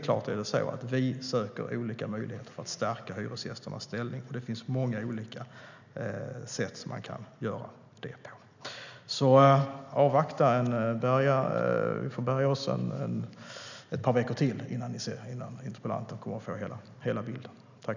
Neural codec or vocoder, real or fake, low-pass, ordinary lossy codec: none; real; 7.2 kHz; none